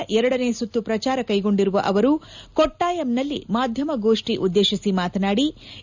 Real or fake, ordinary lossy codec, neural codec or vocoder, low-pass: real; none; none; 7.2 kHz